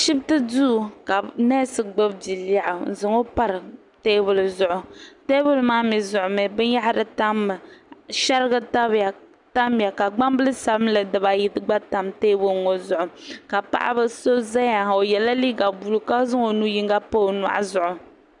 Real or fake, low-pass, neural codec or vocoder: real; 10.8 kHz; none